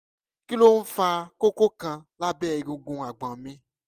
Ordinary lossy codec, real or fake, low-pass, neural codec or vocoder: Opus, 32 kbps; real; 14.4 kHz; none